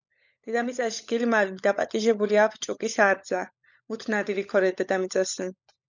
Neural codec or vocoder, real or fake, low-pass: codec, 16 kHz, 16 kbps, FunCodec, trained on LibriTTS, 50 frames a second; fake; 7.2 kHz